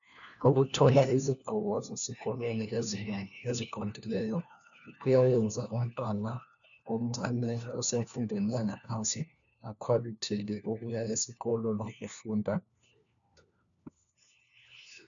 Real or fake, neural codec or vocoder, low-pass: fake; codec, 16 kHz, 1 kbps, FunCodec, trained on LibriTTS, 50 frames a second; 7.2 kHz